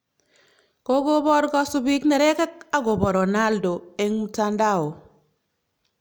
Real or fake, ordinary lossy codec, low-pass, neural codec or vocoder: real; none; none; none